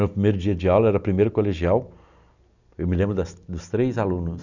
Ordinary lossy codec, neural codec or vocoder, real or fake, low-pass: none; none; real; 7.2 kHz